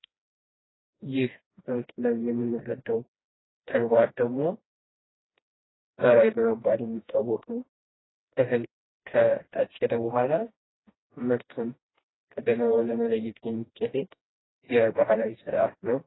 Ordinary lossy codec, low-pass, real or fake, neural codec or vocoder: AAC, 16 kbps; 7.2 kHz; fake; codec, 16 kHz, 1 kbps, FreqCodec, smaller model